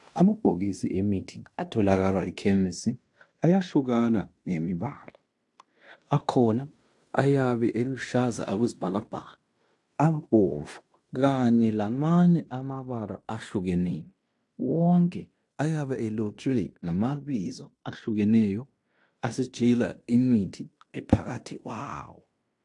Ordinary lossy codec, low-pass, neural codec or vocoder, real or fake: AAC, 64 kbps; 10.8 kHz; codec, 16 kHz in and 24 kHz out, 0.9 kbps, LongCat-Audio-Codec, fine tuned four codebook decoder; fake